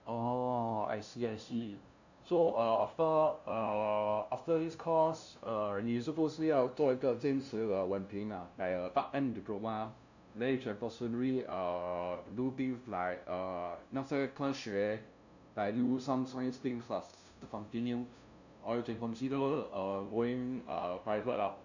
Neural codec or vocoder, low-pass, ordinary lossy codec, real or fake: codec, 16 kHz, 0.5 kbps, FunCodec, trained on LibriTTS, 25 frames a second; 7.2 kHz; none; fake